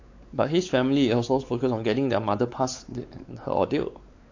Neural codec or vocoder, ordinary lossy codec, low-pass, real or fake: codec, 16 kHz, 4 kbps, X-Codec, WavLM features, trained on Multilingual LibriSpeech; AAC, 48 kbps; 7.2 kHz; fake